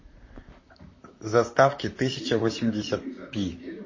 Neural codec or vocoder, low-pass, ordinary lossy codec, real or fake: vocoder, 44.1 kHz, 128 mel bands, Pupu-Vocoder; 7.2 kHz; MP3, 32 kbps; fake